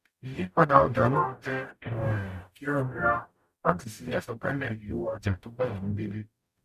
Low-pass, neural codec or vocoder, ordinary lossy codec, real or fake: 14.4 kHz; codec, 44.1 kHz, 0.9 kbps, DAC; none; fake